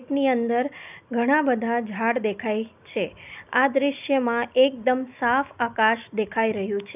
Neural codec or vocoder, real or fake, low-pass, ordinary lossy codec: none; real; 3.6 kHz; none